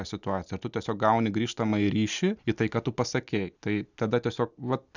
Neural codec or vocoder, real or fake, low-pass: none; real; 7.2 kHz